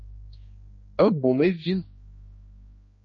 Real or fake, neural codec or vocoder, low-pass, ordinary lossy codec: fake; codec, 16 kHz, 2 kbps, X-Codec, HuBERT features, trained on general audio; 7.2 kHz; MP3, 32 kbps